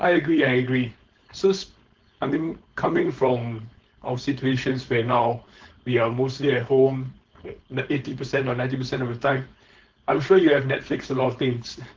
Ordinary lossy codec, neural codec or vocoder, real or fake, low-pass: Opus, 16 kbps; codec, 16 kHz, 4.8 kbps, FACodec; fake; 7.2 kHz